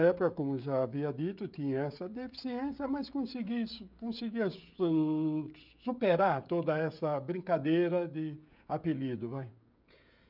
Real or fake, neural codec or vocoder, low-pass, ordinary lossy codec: fake; codec, 16 kHz, 16 kbps, FreqCodec, smaller model; 5.4 kHz; none